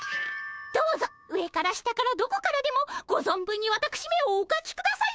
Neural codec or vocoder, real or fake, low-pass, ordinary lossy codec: codec, 16 kHz, 6 kbps, DAC; fake; none; none